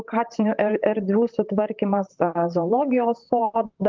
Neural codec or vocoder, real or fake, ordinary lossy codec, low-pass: vocoder, 44.1 kHz, 128 mel bands, Pupu-Vocoder; fake; Opus, 24 kbps; 7.2 kHz